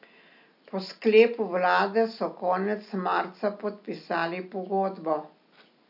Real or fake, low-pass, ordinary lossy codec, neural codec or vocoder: real; 5.4 kHz; none; none